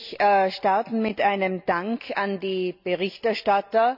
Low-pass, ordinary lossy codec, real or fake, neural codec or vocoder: 5.4 kHz; none; real; none